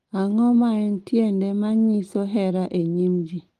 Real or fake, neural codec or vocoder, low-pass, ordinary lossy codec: real; none; 14.4 kHz; Opus, 24 kbps